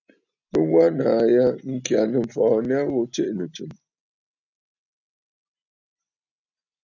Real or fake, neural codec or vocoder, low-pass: real; none; 7.2 kHz